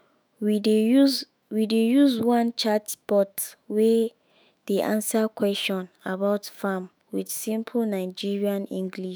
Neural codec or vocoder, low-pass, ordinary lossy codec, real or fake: autoencoder, 48 kHz, 128 numbers a frame, DAC-VAE, trained on Japanese speech; none; none; fake